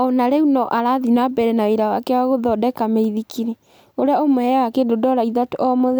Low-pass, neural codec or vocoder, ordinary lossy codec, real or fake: none; none; none; real